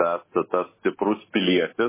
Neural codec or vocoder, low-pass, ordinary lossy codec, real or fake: none; 3.6 kHz; MP3, 16 kbps; real